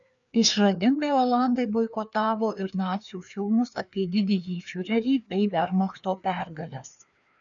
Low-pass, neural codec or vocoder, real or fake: 7.2 kHz; codec, 16 kHz, 2 kbps, FreqCodec, larger model; fake